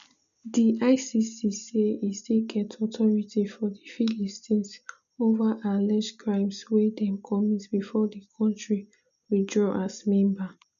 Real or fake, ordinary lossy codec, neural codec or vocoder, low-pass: real; none; none; 7.2 kHz